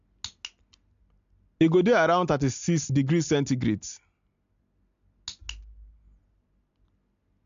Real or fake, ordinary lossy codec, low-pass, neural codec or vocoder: real; AAC, 64 kbps; 7.2 kHz; none